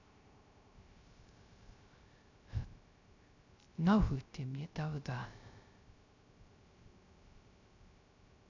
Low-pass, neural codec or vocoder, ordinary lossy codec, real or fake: 7.2 kHz; codec, 16 kHz, 0.3 kbps, FocalCodec; none; fake